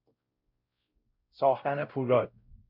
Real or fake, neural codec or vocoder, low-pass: fake; codec, 16 kHz, 0.5 kbps, X-Codec, WavLM features, trained on Multilingual LibriSpeech; 5.4 kHz